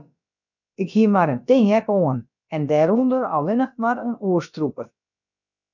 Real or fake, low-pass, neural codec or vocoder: fake; 7.2 kHz; codec, 16 kHz, about 1 kbps, DyCAST, with the encoder's durations